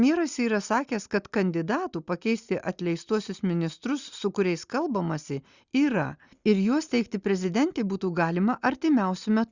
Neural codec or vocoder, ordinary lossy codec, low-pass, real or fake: none; Opus, 64 kbps; 7.2 kHz; real